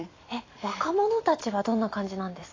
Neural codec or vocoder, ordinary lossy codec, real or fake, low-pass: vocoder, 44.1 kHz, 128 mel bands every 256 samples, BigVGAN v2; AAC, 32 kbps; fake; 7.2 kHz